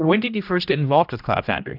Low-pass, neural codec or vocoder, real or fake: 5.4 kHz; codec, 16 kHz, 1 kbps, X-Codec, HuBERT features, trained on general audio; fake